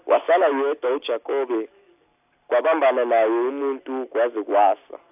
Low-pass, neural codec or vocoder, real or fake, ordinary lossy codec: 3.6 kHz; none; real; AAC, 32 kbps